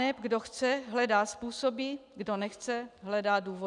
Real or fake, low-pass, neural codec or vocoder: real; 10.8 kHz; none